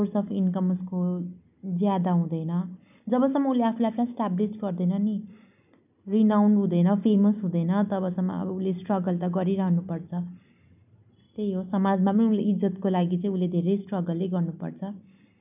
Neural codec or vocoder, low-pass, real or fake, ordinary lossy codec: none; 3.6 kHz; real; none